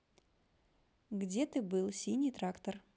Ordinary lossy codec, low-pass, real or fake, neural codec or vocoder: none; none; real; none